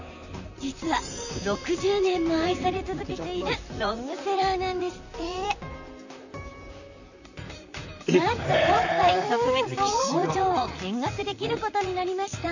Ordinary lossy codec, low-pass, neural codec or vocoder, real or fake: none; 7.2 kHz; vocoder, 44.1 kHz, 128 mel bands, Pupu-Vocoder; fake